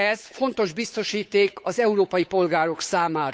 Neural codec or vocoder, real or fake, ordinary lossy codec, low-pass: codec, 16 kHz, 8 kbps, FunCodec, trained on Chinese and English, 25 frames a second; fake; none; none